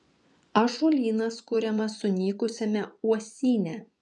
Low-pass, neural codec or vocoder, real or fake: 10.8 kHz; vocoder, 24 kHz, 100 mel bands, Vocos; fake